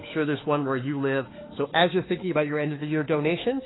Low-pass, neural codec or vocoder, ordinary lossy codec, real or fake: 7.2 kHz; autoencoder, 48 kHz, 32 numbers a frame, DAC-VAE, trained on Japanese speech; AAC, 16 kbps; fake